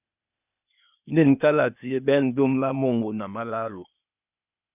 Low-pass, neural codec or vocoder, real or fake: 3.6 kHz; codec, 16 kHz, 0.8 kbps, ZipCodec; fake